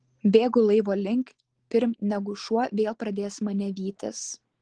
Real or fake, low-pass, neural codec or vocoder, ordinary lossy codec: real; 9.9 kHz; none; Opus, 16 kbps